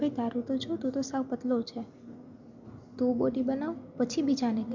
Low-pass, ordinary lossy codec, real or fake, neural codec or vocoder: 7.2 kHz; MP3, 64 kbps; real; none